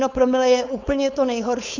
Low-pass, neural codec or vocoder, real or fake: 7.2 kHz; codec, 16 kHz, 4.8 kbps, FACodec; fake